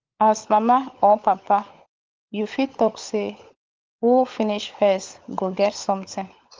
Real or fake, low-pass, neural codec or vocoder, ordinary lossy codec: fake; 7.2 kHz; codec, 16 kHz, 16 kbps, FunCodec, trained on LibriTTS, 50 frames a second; Opus, 32 kbps